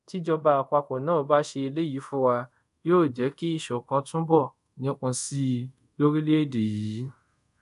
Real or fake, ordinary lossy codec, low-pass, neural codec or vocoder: fake; none; 10.8 kHz; codec, 24 kHz, 0.5 kbps, DualCodec